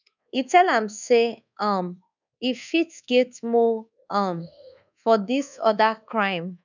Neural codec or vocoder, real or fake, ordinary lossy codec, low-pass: codec, 24 kHz, 1.2 kbps, DualCodec; fake; none; 7.2 kHz